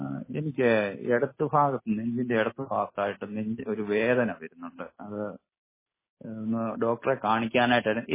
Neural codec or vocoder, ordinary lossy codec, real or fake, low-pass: none; MP3, 16 kbps; real; 3.6 kHz